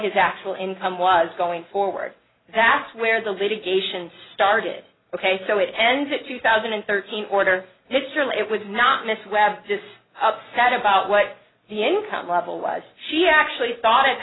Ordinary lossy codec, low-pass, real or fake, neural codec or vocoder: AAC, 16 kbps; 7.2 kHz; real; none